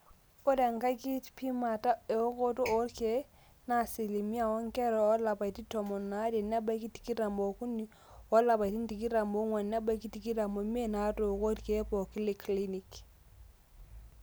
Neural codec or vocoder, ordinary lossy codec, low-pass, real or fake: none; none; none; real